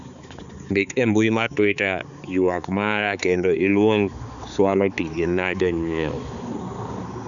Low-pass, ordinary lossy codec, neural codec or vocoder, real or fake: 7.2 kHz; none; codec, 16 kHz, 4 kbps, X-Codec, HuBERT features, trained on balanced general audio; fake